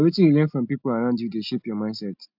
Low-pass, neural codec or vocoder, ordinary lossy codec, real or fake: 5.4 kHz; none; AAC, 48 kbps; real